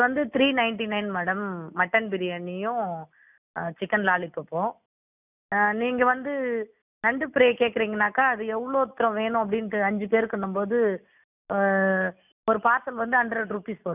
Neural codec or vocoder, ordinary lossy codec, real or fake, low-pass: none; none; real; 3.6 kHz